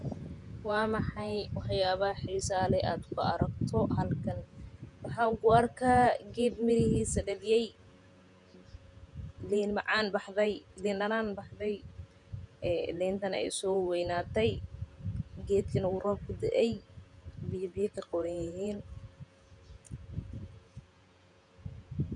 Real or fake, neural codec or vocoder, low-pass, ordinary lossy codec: fake; vocoder, 48 kHz, 128 mel bands, Vocos; 10.8 kHz; none